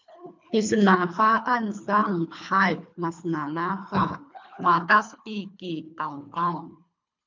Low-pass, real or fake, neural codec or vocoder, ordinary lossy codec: 7.2 kHz; fake; codec, 24 kHz, 3 kbps, HILCodec; MP3, 64 kbps